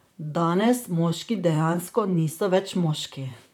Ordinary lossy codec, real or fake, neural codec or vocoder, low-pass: none; fake; vocoder, 44.1 kHz, 128 mel bands, Pupu-Vocoder; 19.8 kHz